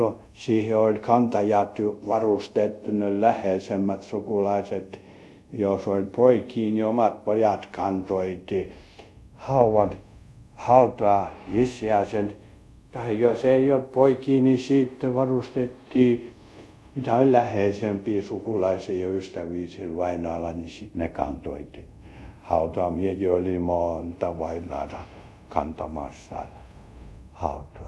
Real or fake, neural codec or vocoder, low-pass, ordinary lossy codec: fake; codec, 24 kHz, 0.5 kbps, DualCodec; none; none